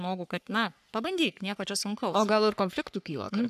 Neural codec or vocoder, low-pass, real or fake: codec, 44.1 kHz, 3.4 kbps, Pupu-Codec; 14.4 kHz; fake